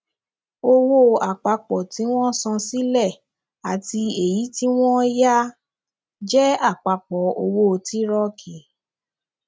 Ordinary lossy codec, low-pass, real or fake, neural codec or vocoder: none; none; real; none